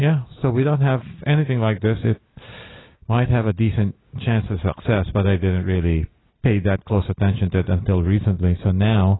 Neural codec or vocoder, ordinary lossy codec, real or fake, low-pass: none; AAC, 16 kbps; real; 7.2 kHz